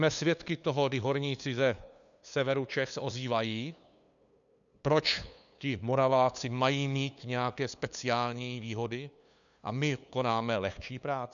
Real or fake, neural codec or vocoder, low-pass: fake; codec, 16 kHz, 2 kbps, FunCodec, trained on LibriTTS, 25 frames a second; 7.2 kHz